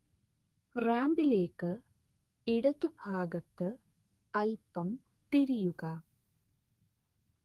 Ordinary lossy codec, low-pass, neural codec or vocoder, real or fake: Opus, 32 kbps; 14.4 kHz; codec, 32 kHz, 1.9 kbps, SNAC; fake